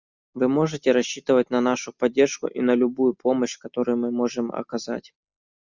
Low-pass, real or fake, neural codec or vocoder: 7.2 kHz; real; none